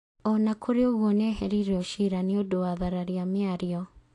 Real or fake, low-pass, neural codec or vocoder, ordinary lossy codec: real; 10.8 kHz; none; AAC, 48 kbps